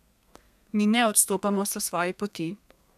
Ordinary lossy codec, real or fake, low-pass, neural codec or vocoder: none; fake; 14.4 kHz; codec, 32 kHz, 1.9 kbps, SNAC